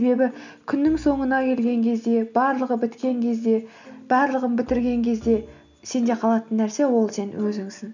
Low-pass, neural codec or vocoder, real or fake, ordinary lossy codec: 7.2 kHz; none; real; none